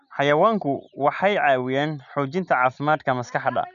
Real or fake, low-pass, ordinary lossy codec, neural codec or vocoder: real; 7.2 kHz; none; none